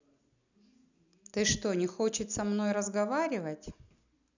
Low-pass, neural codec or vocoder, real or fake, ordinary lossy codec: 7.2 kHz; none; real; none